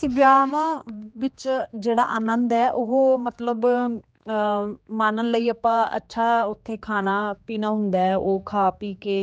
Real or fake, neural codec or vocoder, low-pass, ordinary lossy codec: fake; codec, 16 kHz, 2 kbps, X-Codec, HuBERT features, trained on general audio; none; none